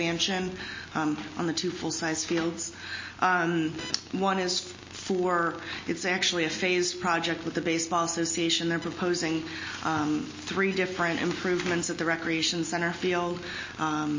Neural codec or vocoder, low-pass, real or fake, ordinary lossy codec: none; 7.2 kHz; real; MP3, 32 kbps